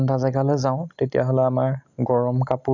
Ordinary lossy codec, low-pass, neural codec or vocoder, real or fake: none; 7.2 kHz; none; real